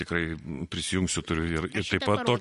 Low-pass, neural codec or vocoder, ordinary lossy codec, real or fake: 14.4 kHz; none; MP3, 48 kbps; real